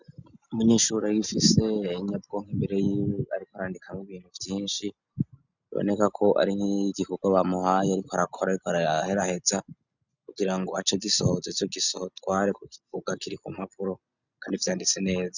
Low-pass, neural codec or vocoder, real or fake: 7.2 kHz; none; real